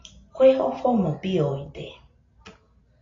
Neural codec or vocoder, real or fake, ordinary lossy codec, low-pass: none; real; AAC, 32 kbps; 7.2 kHz